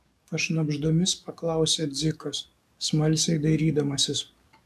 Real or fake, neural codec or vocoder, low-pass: fake; codec, 44.1 kHz, 7.8 kbps, DAC; 14.4 kHz